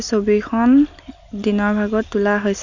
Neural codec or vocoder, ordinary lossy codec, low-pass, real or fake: none; none; 7.2 kHz; real